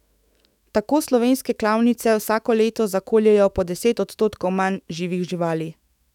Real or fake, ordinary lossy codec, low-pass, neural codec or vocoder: fake; none; 19.8 kHz; autoencoder, 48 kHz, 128 numbers a frame, DAC-VAE, trained on Japanese speech